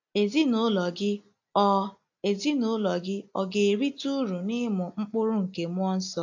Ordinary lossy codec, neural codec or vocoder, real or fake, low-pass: AAC, 48 kbps; none; real; 7.2 kHz